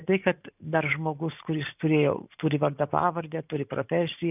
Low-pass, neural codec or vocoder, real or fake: 3.6 kHz; vocoder, 44.1 kHz, 128 mel bands every 512 samples, BigVGAN v2; fake